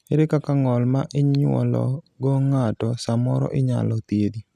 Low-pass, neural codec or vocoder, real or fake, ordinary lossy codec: 14.4 kHz; none; real; none